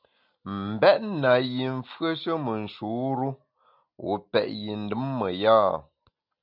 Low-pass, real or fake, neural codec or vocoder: 5.4 kHz; real; none